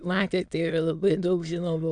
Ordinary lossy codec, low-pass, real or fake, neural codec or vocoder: MP3, 96 kbps; 9.9 kHz; fake; autoencoder, 22.05 kHz, a latent of 192 numbers a frame, VITS, trained on many speakers